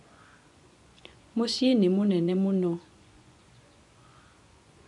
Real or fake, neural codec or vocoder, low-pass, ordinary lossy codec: fake; vocoder, 24 kHz, 100 mel bands, Vocos; 10.8 kHz; none